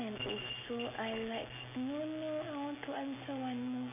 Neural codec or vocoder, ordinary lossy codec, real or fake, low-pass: none; none; real; 3.6 kHz